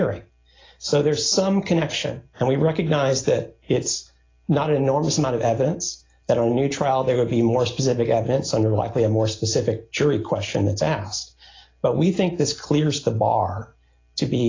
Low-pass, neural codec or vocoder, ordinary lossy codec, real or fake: 7.2 kHz; none; AAC, 32 kbps; real